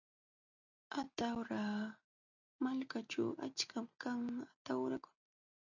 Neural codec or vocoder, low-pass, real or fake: none; 7.2 kHz; real